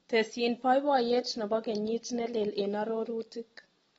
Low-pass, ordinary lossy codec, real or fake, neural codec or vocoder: 14.4 kHz; AAC, 24 kbps; real; none